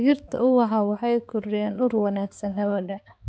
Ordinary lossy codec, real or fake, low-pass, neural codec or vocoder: none; fake; none; codec, 16 kHz, 4 kbps, X-Codec, HuBERT features, trained on balanced general audio